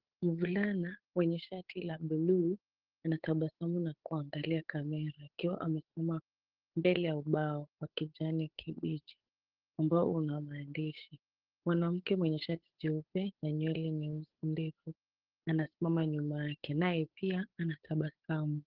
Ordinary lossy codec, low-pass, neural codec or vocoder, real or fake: Opus, 16 kbps; 5.4 kHz; codec, 16 kHz, 8 kbps, FunCodec, trained on Chinese and English, 25 frames a second; fake